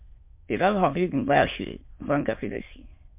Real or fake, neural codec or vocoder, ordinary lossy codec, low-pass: fake; autoencoder, 22.05 kHz, a latent of 192 numbers a frame, VITS, trained on many speakers; MP3, 32 kbps; 3.6 kHz